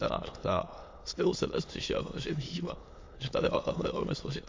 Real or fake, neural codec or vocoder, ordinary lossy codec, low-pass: fake; autoencoder, 22.05 kHz, a latent of 192 numbers a frame, VITS, trained on many speakers; MP3, 48 kbps; 7.2 kHz